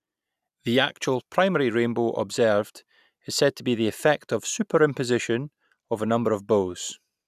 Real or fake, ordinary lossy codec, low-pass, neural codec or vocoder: real; none; 14.4 kHz; none